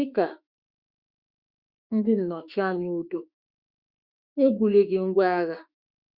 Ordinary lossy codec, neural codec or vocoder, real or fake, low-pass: Opus, 64 kbps; autoencoder, 48 kHz, 32 numbers a frame, DAC-VAE, trained on Japanese speech; fake; 5.4 kHz